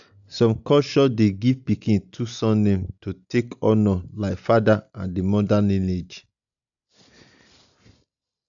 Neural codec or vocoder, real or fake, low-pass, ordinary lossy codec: none; real; 7.2 kHz; none